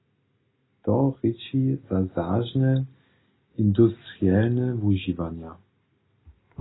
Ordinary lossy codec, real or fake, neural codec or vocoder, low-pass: AAC, 16 kbps; real; none; 7.2 kHz